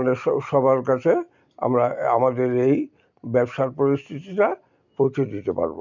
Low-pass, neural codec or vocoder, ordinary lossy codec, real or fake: 7.2 kHz; none; none; real